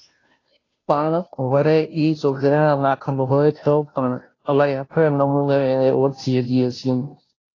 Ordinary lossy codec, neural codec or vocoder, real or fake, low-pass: AAC, 32 kbps; codec, 16 kHz, 0.5 kbps, FunCodec, trained on Chinese and English, 25 frames a second; fake; 7.2 kHz